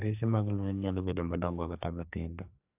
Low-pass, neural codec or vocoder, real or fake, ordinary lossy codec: 3.6 kHz; codec, 44.1 kHz, 2.6 kbps, SNAC; fake; AAC, 32 kbps